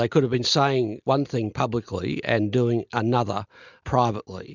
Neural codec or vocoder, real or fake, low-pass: none; real; 7.2 kHz